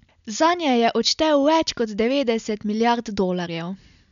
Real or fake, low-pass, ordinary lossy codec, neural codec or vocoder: real; 7.2 kHz; none; none